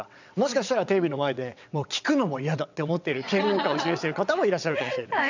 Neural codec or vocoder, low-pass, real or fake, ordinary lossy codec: vocoder, 22.05 kHz, 80 mel bands, WaveNeXt; 7.2 kHz; fake; none